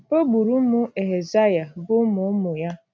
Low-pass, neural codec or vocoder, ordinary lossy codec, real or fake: none; none; none; real